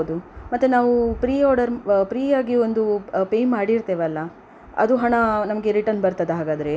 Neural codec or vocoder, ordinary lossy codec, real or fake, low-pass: none; none; real; none